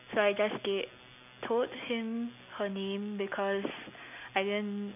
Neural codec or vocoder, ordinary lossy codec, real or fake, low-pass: none; none; real; 3.6 kHz